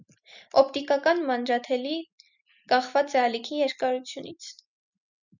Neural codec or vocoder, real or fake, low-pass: none; real; 7.2 kHz